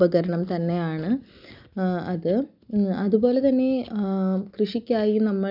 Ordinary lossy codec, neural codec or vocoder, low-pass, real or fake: none; none; 5.4 kHz; real